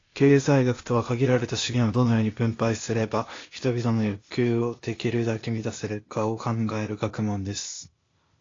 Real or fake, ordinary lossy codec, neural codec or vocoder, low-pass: fake; AAC, 32 kbps; codec, 16 kHz, 0.8 kbps, ZipCodec; 7.2 kHz